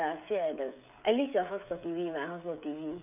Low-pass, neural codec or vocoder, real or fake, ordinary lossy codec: 3.6 kHz; codec, 16 kHz, 16 kbps, FreqCodec, smaller model; fake; none